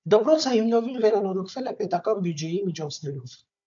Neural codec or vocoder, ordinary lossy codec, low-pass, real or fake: codec, 16 kHz, 4 kbps, FunCodec, trained on Chinese and English, 50 frames a second; AAC, 64 kbps; 7.2 kHz; fake